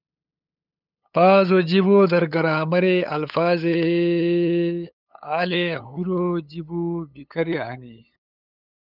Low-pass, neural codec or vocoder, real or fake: 5.4 kHz; codec, 16 kHz, 8 kbps, FunCodec, trained on LibriTTS, 25 frames a second; fake